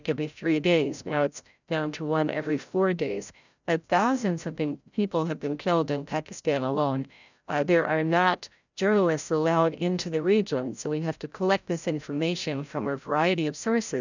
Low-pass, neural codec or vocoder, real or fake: 7.2 kHz; codec, 16 kHz, 0.5 kbps, FreqCodec, larger model; fake